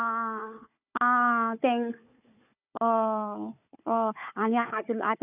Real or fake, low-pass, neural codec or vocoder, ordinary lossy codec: fake; 3.6 kHz; codec, 16 kHz, 4 kbps, FunCodec, trained on Chinese and English, 50 frames a second; none